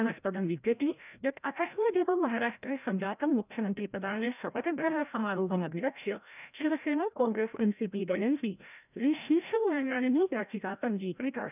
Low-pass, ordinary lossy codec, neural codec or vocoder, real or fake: 3.6 kHz; none; codec, 16 kHz, 0.5 kbps, FreqCodec, larger model; fake